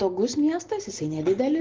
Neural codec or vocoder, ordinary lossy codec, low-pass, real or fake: none; Opus, 16 kbps; 7.2 kHz; real